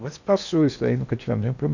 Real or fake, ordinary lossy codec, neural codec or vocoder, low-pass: fake; none; codec, 16 kHz in and 24 kHz out, 0.8 kbps, FocalCodec, streaming, 65536 codes; 7.2 kHz